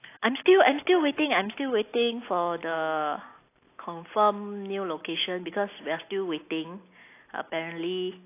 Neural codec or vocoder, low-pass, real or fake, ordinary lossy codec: none; 3.6 kHz; real; AAC, 24 kbps